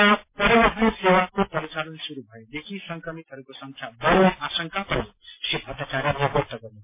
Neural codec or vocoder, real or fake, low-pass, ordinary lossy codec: none; real; 3.6 kHz; MP3, 24 kbps